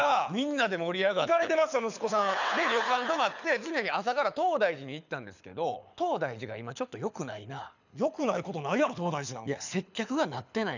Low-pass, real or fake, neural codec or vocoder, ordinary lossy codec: 7.2 kHz; fake; codec, 24 kHz, 6 kbps, HILCodec; none